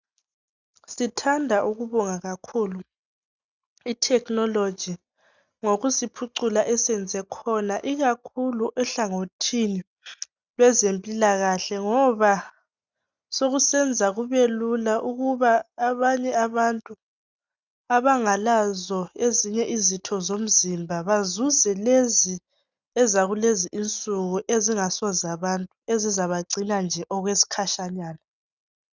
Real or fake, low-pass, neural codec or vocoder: real; 7.2 kHz; none